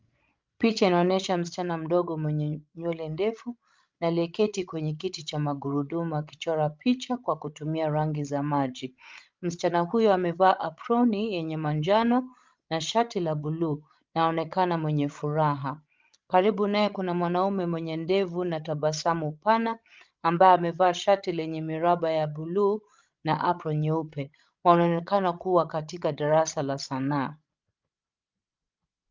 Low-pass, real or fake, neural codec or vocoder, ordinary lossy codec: 7.2 kHz; fake; codec, 16 kHz, 16 kbps, FreqCodec, larger model; Opus, 24 kbps